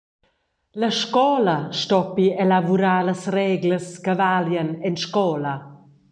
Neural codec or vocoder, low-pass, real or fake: none; 9.9 kHz; real